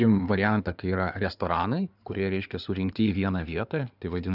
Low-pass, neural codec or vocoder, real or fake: 5.4 kHz; codec, 16 kHz in and 24 kHz out, 2.2 kbps, FireRedTTS-2 codec; fake